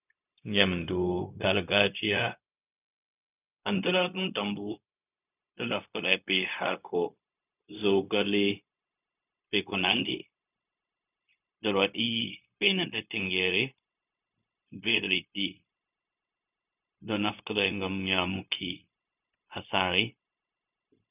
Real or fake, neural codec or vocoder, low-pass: fake; codec, 16 kHz, 0.4 kbps, LongCat-Audio-Codec; 3.6 kHz